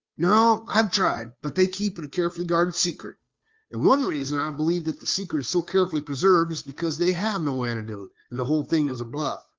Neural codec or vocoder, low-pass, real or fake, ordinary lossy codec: codec, 16 kHz, 2 kbps, FunCodec, trained on Chinese and English, 25 frames a second; 7.2 kHz; fake; Opus, 32 kbps